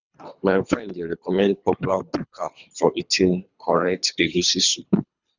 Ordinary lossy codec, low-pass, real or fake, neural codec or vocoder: none; 7.2 kHz; fake; codec, 24 kHz, 3 kbps, HILCodec